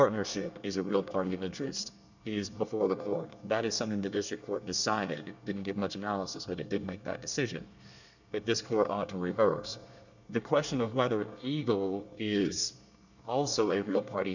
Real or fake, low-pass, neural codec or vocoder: fake; 7.2 kHz; codec, 24 kHz, 1 kbps, SNAC